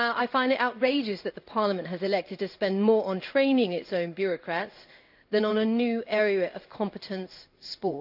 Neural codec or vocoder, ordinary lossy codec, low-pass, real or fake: codec, 16 kHz in and 24 kHz out, 1 kbps, XY-Tokenizer; none; 5.4 kHz; fake